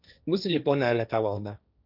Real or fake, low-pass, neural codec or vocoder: fake; 5.4 kHz; codec, 16 kHz, 1.1 kbps, Voila-Tokenizer